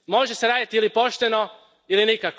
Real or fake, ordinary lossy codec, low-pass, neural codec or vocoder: real; none; none; none